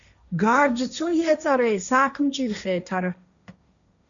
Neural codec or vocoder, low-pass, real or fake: codec, 16 kHz, 1.1 kbps, Voila-Tokenizer; 7.2 kHz; fake